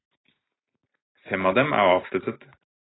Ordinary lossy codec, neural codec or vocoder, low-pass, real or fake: AAC, 16 kbps; none; 7.2 kHz; real